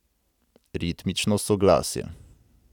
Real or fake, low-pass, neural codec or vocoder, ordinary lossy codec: real; 19.8 kHz; none; none